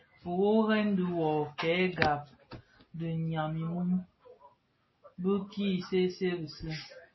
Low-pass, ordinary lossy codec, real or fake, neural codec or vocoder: 7.2 kHz; MP3, 24 kbps; real; none